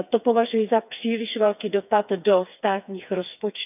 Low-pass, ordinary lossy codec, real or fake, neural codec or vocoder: 3.6 kHz; none; fake; codec, 16 kHz, 4 kbps, FreqCodec, smaller model